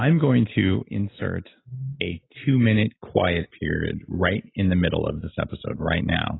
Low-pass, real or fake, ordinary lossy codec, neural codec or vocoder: 7.2 kHz; real; AAC, 16 kbps; none